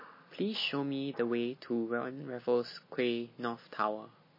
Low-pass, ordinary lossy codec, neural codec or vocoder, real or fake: 5.4 kHz; MP3, 24 kbps; none; real